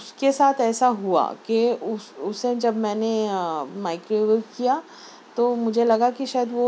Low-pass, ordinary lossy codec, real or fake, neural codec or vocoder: none; none; real; none